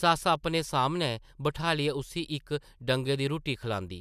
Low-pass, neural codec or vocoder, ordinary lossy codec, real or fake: 14.4 kHz; none; none; real